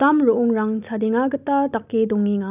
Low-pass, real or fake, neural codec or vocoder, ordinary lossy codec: 3.6 kHz; real; none; none